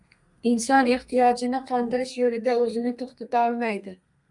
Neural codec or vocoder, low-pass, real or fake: codec, 32 kHz, 1.9 kbps, SNAC; 10.8 kHz; fake